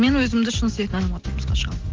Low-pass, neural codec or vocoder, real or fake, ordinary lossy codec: 7.2 kHz; none; real; Opus, 16 kbps